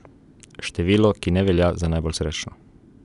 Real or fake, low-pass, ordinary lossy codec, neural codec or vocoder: real; 10.8 kHz; none; none